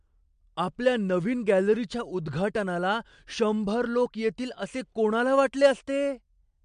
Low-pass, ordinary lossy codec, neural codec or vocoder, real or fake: 9.9 kHz; MP3, 64 kbps; none; real